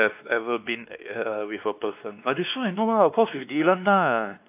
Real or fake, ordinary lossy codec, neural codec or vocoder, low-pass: fake; AAC, 32 kbps; codec, 16 kHz, 2 kbps, X-Codec, WavLM features, trained on Multilingual LibriSpeech; 3.6 kHz